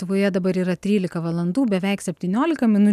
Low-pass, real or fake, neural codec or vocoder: 14.4 kHz; real; none